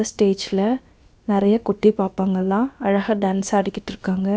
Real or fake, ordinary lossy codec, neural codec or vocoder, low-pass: fake; none; codec, 16 kHz, about 1 kbps, DyCAST, with the encoder's durations; none